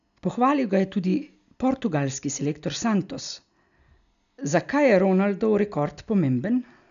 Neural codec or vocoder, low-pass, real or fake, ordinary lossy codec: none; 7.2 kHz; real; none